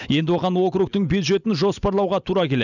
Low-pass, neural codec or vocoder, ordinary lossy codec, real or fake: 7.2 kHz; none; none; real